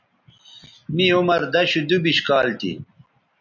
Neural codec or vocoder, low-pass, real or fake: none; 7.2 kHz; real